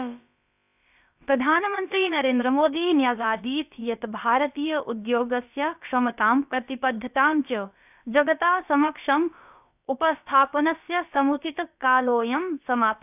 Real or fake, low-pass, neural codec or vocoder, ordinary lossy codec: fake; 3.6 kHz; codec, 16 kHz, about 1 kbps, DyCAST, with the encoder's durations; none